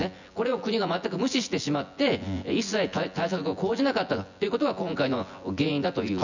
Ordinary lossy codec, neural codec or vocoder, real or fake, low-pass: none; vocoder, 24 kHz, 100 mel bands, Vocos; fake; 7.2 kHz